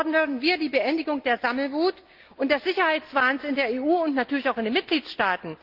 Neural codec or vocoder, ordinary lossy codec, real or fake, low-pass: none; Opus, 32 kbps; real; 5.4 kHz